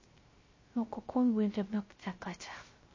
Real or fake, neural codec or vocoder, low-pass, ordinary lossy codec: fake; codec, 16 kHz, 0.3 kbps, FocalCodec; 7.2 kHz; MP3, 32 kbps